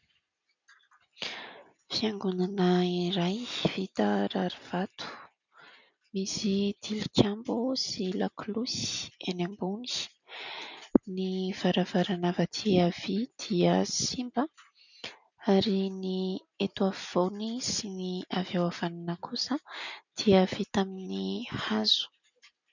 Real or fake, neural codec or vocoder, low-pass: real; none; 7.2 kHz